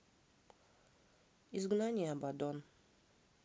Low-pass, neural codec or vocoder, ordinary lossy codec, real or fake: none; none; none; real